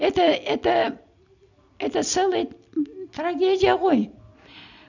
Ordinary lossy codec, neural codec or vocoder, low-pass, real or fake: none; none; 7.2 kHz; real